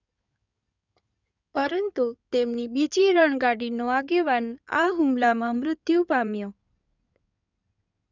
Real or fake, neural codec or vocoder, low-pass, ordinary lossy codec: fake; codec, 16 kHz in and 24 kHz out, 2.2 kbps, FireRedTTS-2 codec; 7.2 kHz; none